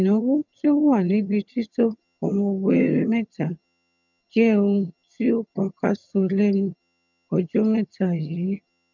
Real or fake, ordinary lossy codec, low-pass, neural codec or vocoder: fake; none; 7.2 kHz; vocoder, 22.05 kHz, 80 mel bands, HiFi-GAN